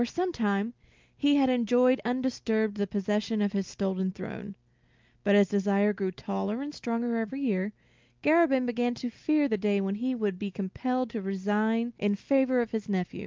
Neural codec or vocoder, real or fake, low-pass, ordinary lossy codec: none; real; 7.2 kHz; Opus, 32 kbps